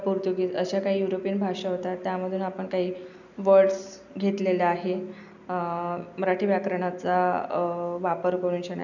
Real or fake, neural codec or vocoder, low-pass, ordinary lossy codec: real; none; 7.2 kHz; none